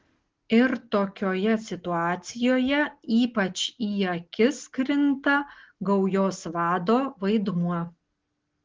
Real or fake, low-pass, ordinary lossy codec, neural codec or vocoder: real; 7.2 kHz; Opus, 16 kbps; none